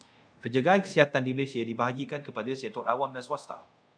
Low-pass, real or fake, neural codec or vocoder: 9.9 kHz; fake; codec, 24 kHz, 0.5 kbps, DualCodec